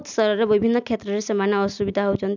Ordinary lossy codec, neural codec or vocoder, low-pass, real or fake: none; none; 7.2 kHz; real